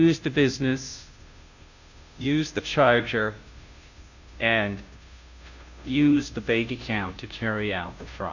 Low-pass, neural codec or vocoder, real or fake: 7.2 kHz; codec, 16 kHz, 0.5 kbps, FunCodec, trained on Chinese and English, 25 frames a second; fake